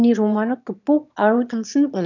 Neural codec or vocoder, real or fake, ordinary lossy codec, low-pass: autoencoder, 22.05 kHz, a latent of 192 numbers a frame, VITS, trained on one speaker; fake; none; 7.2 kHz